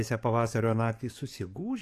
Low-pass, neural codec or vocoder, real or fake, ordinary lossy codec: 14.4 kHz; codec, 44.1 kHz, 7.8 kbps, DAC; fake; AAC, 64 kbps